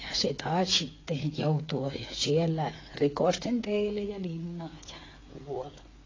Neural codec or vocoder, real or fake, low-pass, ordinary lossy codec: codec, 16 kHz, 8 kbps, FreqCodec, smaller model; fake; 7.2 kHz; AAC, 32 kbps